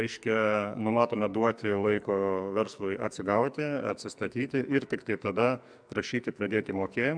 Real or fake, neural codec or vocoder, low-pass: fake; codec, 44.1 kHz, 2.6 kbps, SNAC; 9.9 kHz